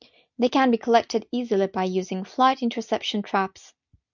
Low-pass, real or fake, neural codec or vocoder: 7.2 kHz; real; none